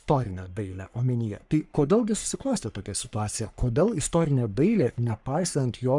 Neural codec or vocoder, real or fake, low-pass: codec, 44.1 kHz, 3.4 kbps, Pupu-Codec; fake; 10.8 kHz